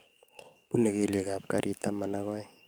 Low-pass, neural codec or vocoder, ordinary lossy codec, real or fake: none; codec, 44.1 kHz, 7.8 kbps, DAC; none; fake